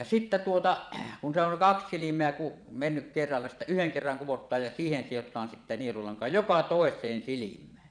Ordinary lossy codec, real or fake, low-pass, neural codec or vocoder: none; fake; 9.9 kHz; vocoder, 22.05 kHz, 80 mel bands, Vocos